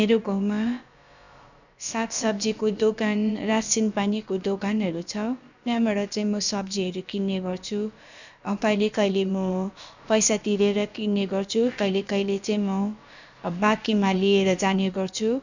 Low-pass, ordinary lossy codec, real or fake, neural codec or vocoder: 7.2 kHz; none; fake; codec, 16 kHz, about 1 kbps, DyCAST, with the encoder's durations